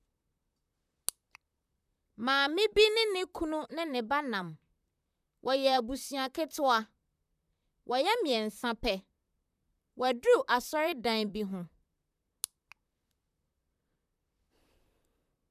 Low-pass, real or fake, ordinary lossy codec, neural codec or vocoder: 14.4 kHz; fake; none; vocoder, 44.1 kHz, 128 mel bands, Pupu-Vocoder